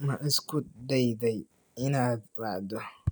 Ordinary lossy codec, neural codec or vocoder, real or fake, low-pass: none; none; real; none